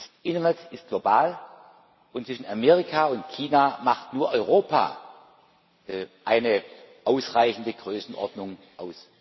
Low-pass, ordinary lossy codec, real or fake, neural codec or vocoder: 7.2 kHz; MP3, 24 kbps; real; none